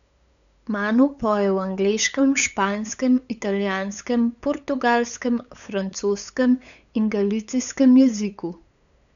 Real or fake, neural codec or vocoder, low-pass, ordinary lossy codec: fake; codec, 16 kHz, 8 kbps, FunCodec, trained on LibriTTS, 25 frames a second; 7.2 kHz; none